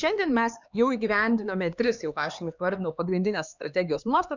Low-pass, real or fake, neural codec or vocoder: 7.2 kHz; fake; codec, 16 kHz, 2 kbps, X-Codec, HuBERT features, trained on LibriSpeech